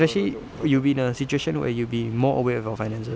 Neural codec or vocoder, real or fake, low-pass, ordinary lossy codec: none; real; none; none